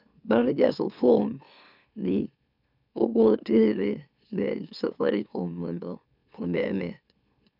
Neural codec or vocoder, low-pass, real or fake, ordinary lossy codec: autoencoder, 44.1 kHz, a latent of 192 numbers a frame, MeloTTS; 5.4 kHz; fake; none